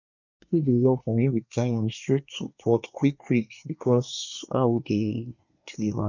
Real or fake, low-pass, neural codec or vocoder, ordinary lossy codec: fake; 7.2 kHz; codec, 24 kHz, 1 kbps, SNAC; none